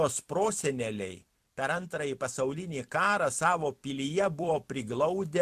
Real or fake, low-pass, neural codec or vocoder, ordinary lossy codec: fake; 14.4 kHz; vocoder, 44.1 kHz, 128 mel bands every 256 samples, BigVGAN v2; MP3, 96 kbps